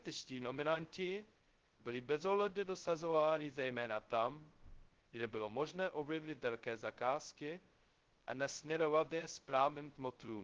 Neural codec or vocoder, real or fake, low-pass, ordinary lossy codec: codec, 16 kHz, 0.2 kbps, FocalCodec; fake; 7.2 kHz; Opus, 16 kbps